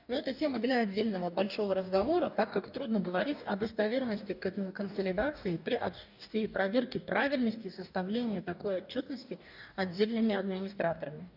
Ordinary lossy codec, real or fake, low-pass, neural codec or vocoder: none; fake; 5.4 kHz; codec, 44.1 kHz, 2.6 kbps, DAC